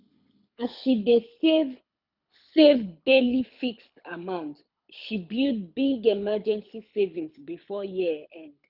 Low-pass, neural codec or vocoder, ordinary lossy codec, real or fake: 5.4 kHz; codec, 24 kHz, 6 kbps, HILCodec; none; fake